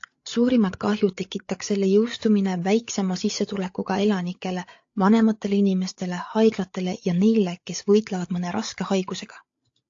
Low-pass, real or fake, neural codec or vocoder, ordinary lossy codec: 7.2 kHz; fake; codec, 16 kHz, 8 kbps, FreqCodec, larger model; AAC, 48 kbps